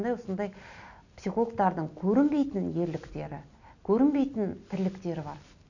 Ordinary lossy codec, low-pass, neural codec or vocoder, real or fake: none; 7.2 kHz; none; real